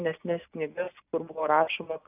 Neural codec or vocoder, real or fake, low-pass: none; real; 3.6 kHz